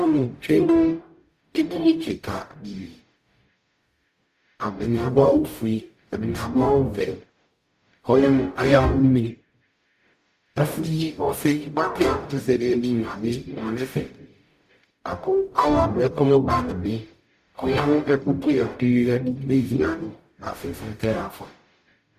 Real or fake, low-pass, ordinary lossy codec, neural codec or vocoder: fake; 14.4 kHz; Opus, 64 kbps; codec, 44.1 kHz, 0.9 kbps, DAC